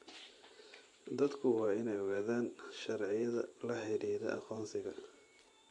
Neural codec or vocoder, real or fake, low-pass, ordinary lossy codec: vocoder, 44.1 kHz, 128 mel bands every 512 samples, BigVGAN v2; fake; 10.8 kHz; MP3, 48 kbps